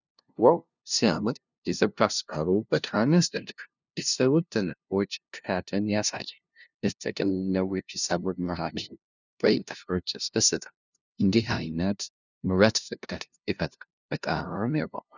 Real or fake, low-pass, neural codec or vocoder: fake; 7.2 kHz; codec, 16 kHz, 0.5 kbps, FunCodec, trained on LibriTTS, 25 frames a second